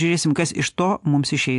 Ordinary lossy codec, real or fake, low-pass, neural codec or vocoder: AAC, 64 kbps; real; 10.8 kHz; none